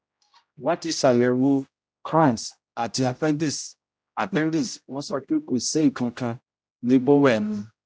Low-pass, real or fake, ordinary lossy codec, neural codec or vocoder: none; fake; none; codec, 16 kHz, 0.5 kbps, X-Codec, HuBERT features, trained on general audio